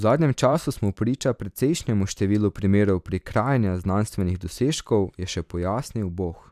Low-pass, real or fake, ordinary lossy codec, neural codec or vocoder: 14.4 kHz; fake; none; vocoder, 44.1 kHz, 128 mel bands every 256 samples, BigVGAN v2